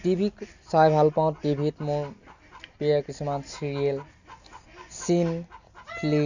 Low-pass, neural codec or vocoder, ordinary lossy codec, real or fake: 7.2 kHz; none; none; real